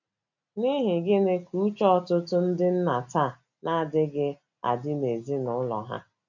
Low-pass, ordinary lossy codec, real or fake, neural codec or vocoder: 7.2 kHz; none; real; none